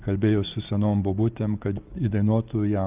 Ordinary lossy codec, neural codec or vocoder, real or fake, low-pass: Opus, 24 kbps; none; real; 3.6 kHz